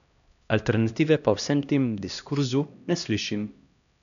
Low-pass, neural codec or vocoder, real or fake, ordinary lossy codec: 7.2 kHz; codec, 16 kHz, 1 kbps, X-Codec, HuBERT features, trained on LibriSpeech; fake; none